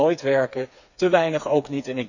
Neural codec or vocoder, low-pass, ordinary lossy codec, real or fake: codec, 16 kHz, 4 kbps, FreqCodec, smaller model; 7.2 kHz; none; fake